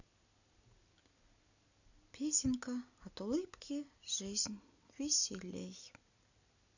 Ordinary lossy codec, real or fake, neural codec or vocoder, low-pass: none; real; none; 7.2 kHz